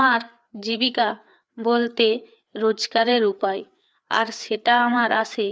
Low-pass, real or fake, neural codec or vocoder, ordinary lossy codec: none; fake; codec, 16 kHz, 4 kbps, FreqCodec, larger model; none